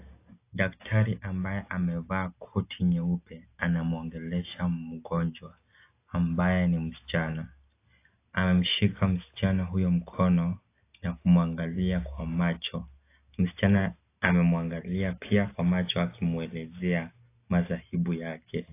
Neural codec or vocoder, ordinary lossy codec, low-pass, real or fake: none; AAC, 24 kbps; 3.6 kHz; real